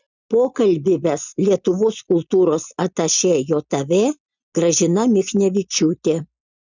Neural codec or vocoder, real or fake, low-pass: none; real; 7.2 kHz